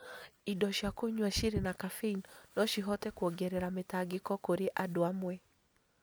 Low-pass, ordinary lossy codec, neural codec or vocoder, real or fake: none; none; none; real